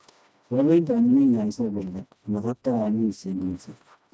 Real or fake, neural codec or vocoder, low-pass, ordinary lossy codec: fake; codec, 16 kHz, 1 kbps, FreqCodec, smaller model; none; none